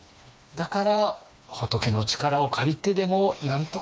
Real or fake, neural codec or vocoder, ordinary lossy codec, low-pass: fake; codec, 16 kHz, 2 kbps, FreqCodec, smaller model; none; none